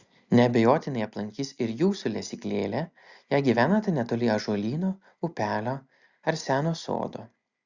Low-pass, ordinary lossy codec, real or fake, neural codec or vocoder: 7.2 kHz; Opus, 64 kbps; real; none